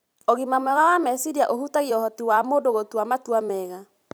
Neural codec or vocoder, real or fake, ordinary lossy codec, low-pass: vocoder, 44.1 kHz, 128 mel bands every 256 samples, BigVGAN v2; fake; none; none